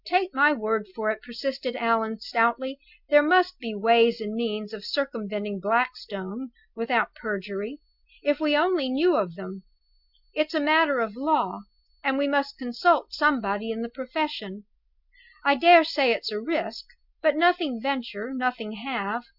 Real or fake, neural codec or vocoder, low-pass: real; none; 5.4 kHz